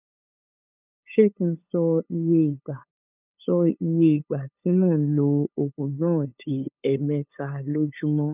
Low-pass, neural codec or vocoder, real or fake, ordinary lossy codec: 3.6 kHz; codec, 16 kHz, 8 kbps, FunCodec, trained on LibriTTS, 25 frames a second; fake; none